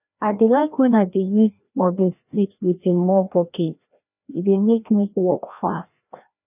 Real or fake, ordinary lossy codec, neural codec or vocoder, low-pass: fake; none; codec, 16 kHz, 1 kbps, FreqCodec, larger model; 3.6 kHz